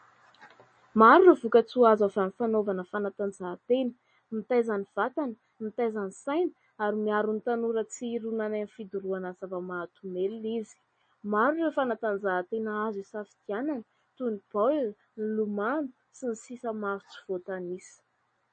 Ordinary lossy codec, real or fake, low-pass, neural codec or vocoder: MP3, 32 kbps; real; 9.9 kHz; none